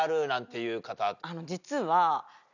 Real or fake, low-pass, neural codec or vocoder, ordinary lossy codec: real; 7.2 kHz; none; none